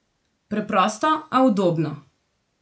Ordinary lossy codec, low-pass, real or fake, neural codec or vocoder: none; none; real; none